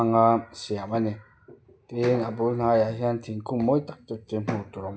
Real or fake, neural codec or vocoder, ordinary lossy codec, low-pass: real; none; none; none